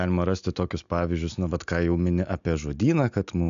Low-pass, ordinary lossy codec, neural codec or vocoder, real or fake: 7.2 kHz; MP3, 64 kbps; none; real